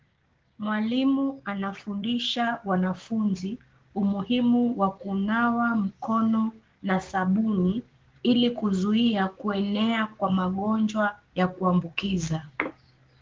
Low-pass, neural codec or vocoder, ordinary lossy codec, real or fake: 7.2 kHz; codec, 16 kHz, 6 kbps, DAC; Opus, 16 kbps; fake